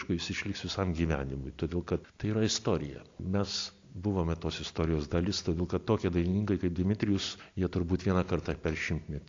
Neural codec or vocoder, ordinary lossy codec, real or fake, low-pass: none; AAC, 48 kbps; real; 7.2 kHz